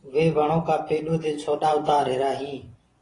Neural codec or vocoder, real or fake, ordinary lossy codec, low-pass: vocoder, 24 kHz, 100 mel bands, Vocos; fake; AAC, 32 kbps; 10.8 kHz